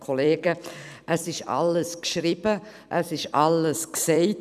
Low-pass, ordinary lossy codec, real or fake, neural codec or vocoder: 14.4 kHz; none; fake; vocoder, 44.1 kHz, 128 mel bands every 256 samples, BigVGAN v2